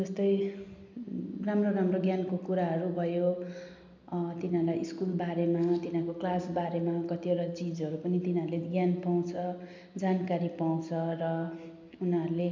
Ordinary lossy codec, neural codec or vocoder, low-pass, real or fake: MP3, 64 kbps; none; 7.2 kHz; real